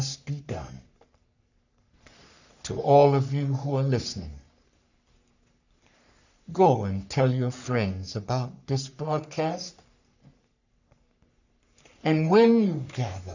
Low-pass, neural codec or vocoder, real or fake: 7.2 kHz; codec, 44.1 kHz, 3.4 kbps, Pupu-Codec; fake